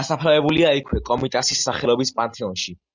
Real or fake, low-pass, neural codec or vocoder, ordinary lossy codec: real; 7.2 kHz; none; Opus, 64 kbps